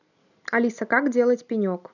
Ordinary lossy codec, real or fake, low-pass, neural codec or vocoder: none; real; 7.2 kHz; none